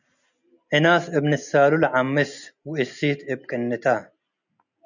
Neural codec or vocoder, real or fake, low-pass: none; real; 7.2 kHz